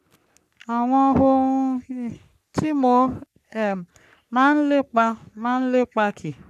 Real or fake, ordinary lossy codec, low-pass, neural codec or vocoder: fake; none; 14.4 kHz; codec, 44.1 kHz, 3.4 kbps, Pupu-Codec